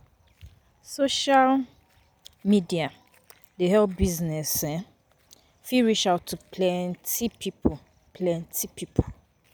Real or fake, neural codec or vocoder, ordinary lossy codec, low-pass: real; none; none; none